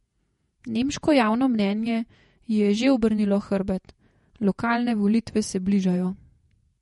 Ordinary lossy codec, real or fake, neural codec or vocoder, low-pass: MP3, 48 kbps; fake; vocoder, 48 kHz, 128 mel bands, Vocos; 19.8 kHz